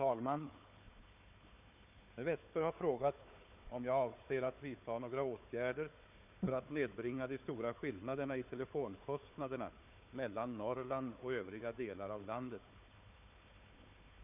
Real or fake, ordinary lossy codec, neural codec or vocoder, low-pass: fake; none; codec, 16 kHz, 4 kbps, FunCodec, trained on Chinese and English, 50 frames a second; 3.6 kHz